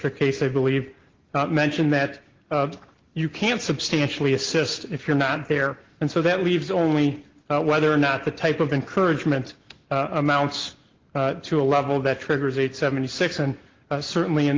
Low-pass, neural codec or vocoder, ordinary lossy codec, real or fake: 7.2 kHz; none; Opus, 16 kbps; real